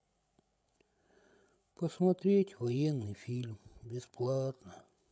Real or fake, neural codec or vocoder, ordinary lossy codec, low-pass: fake; codec, 16 kHz, 16 kbps, FreqCodec, larger model; none; none